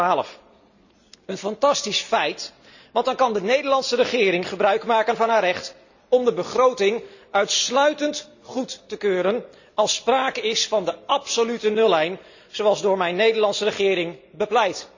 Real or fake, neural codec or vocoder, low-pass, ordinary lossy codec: real; none; 7.2 kHz; none